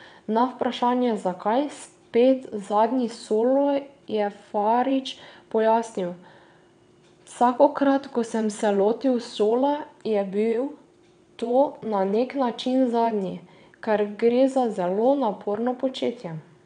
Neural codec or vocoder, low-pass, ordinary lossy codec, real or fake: vocoder, 22.05 kHz, 80 mel bands, WaveNeXt; 9.9 kHz; none; fake